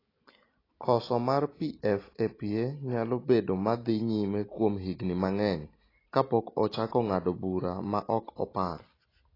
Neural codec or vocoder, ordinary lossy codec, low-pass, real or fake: none; AAC, 24 kbps; 5.4 kHz; real